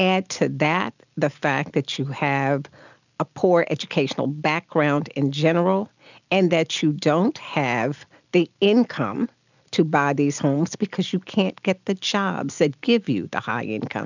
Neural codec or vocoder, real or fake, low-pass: none; real; 7.2 kHz